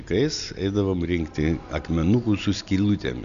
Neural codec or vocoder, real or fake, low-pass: none; real; 7.2 kHz